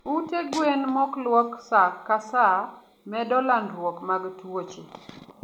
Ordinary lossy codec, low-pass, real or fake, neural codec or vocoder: none; 19.8 kHz; real; none